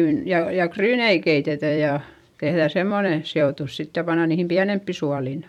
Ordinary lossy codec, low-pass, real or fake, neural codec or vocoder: none; 19.8 kHz; fake; vocoder, 44.1 kHz, 128 mel bands every 256 samples, BigVGAN v2